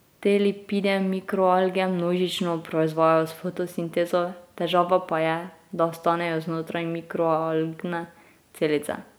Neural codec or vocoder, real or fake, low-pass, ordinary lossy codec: none; real; none; none